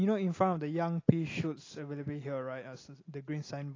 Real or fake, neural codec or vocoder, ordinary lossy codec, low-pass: real; none; AAC, 32 kbps; 7.2 kHz